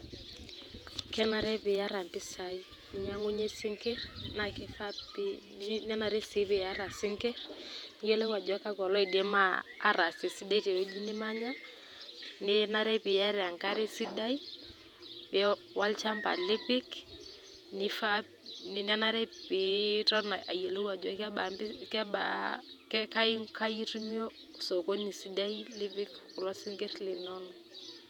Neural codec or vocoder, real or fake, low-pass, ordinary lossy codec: vocoder, 48 kHz, 128 mel bands, Vocos; fake; 19.8 kHz; none